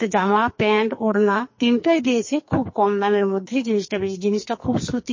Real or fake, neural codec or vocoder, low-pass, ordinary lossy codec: fake; codec, 44.1 kHz, 2.6 kbps, SNAC; 7.2 kHz; MP3, 32 kbps